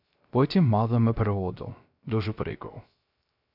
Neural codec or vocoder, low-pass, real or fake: codec, 16 kHz, 0.3 kbps, FocalCodec; 5.4 kHz; fake